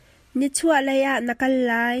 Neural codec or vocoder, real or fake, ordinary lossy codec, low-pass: none; real; MP3, 64 kbps; 14.4 kHz